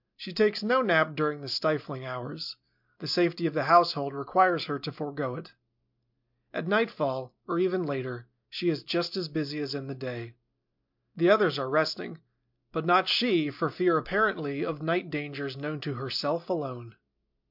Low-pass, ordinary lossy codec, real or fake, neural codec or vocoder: 5.4 kHz; MP3, 48 kbps; real; none